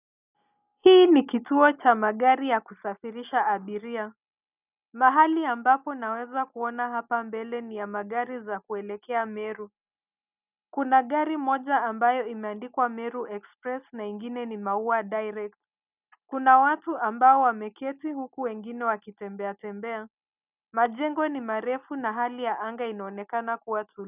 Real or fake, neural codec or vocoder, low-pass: real; none; 3.6 kHz